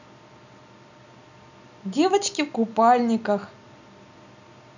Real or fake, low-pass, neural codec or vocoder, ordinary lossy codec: real; 7.2 kHz; none; none